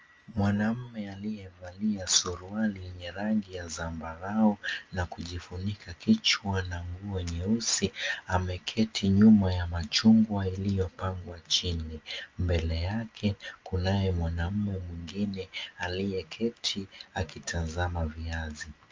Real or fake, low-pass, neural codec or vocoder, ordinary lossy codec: real; 7.2 kHz; none; Opus, 24 kbps